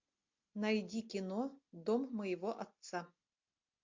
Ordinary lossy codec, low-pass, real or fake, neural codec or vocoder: MP3, 48 kbps; 7.2 kHz; real; none